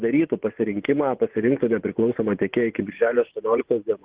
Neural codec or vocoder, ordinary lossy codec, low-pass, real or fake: vocoder, 24 kHz, 100 mel bands, Vocos; Opus, 16 kbps; 3.6 kHz; fake